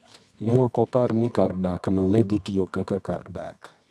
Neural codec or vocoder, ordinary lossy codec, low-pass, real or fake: codec, 24 kHz, 0.9 kbps, WavTokenizer, medium music audio release; none; none; fake